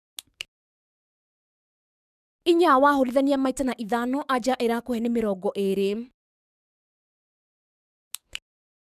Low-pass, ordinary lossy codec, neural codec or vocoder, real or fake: 14.4 kHz; none; codec, 44.1 kHz, 7.8 kbps, DAC; fake